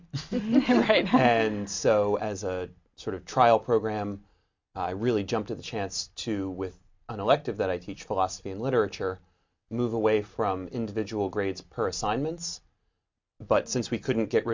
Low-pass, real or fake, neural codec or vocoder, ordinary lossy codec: 7.2 kHz; real; none; MP3, 64 kbps